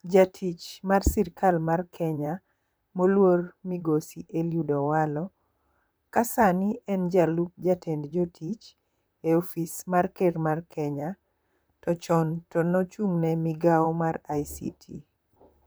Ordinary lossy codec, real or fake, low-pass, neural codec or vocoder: none; fake; none; vocoder, 44.1 kHz, 128 mel bands, Pupu-Vocoder